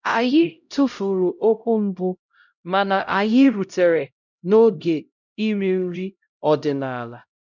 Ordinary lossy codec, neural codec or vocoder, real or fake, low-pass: none; codec, 16 kHz, 0.5 kbps, X-Codec, HuBERT features, trained on LibriSpeech; fake; 7.2 kHz